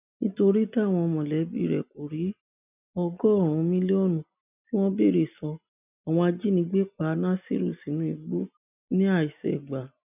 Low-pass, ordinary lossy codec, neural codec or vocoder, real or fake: 3.6 kHz; none; none; real